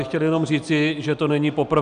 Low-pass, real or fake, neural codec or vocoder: 9.9 kHz; real; none